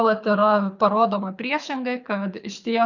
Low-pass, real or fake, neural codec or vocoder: 7.2 kHz; fake; codec, 24 kHz, 6 kbps, HILCodec